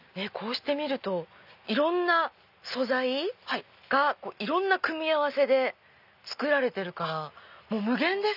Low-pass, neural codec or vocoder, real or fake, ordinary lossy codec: 5.4 kHz; none; real; none